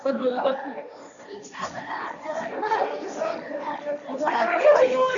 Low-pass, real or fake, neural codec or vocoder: 7.2 kHz; fake; codec, 16 kHz, 1.1 kbps, Voila-Tokenizer